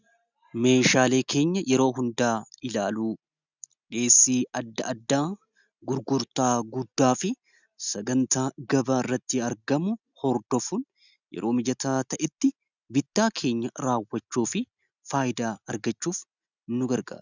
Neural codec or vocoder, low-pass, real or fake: none; 7.2 kHz; real